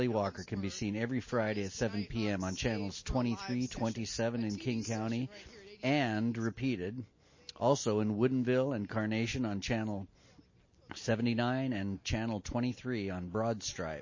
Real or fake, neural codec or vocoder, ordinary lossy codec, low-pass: real; none; MP3, 32 kbps; 7.2 kHz